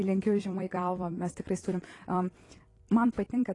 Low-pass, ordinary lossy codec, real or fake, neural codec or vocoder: 10.8 kHz; AAC, 32 kbps; fake; vocoder, 44.1 kHz, 128 mel bands every 256 samples, BigVGAN v2